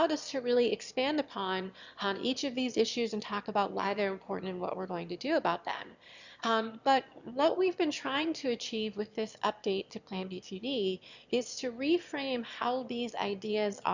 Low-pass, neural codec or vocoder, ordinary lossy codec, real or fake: 7.2 kHz; autoencoder, 22.05 kHz, a latent of 192 numbers a frame, VITS, trained on one speaker; Opus, 64 kbps; fake